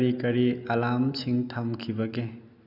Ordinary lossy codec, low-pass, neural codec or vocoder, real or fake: none; 5.4 kHz; none; real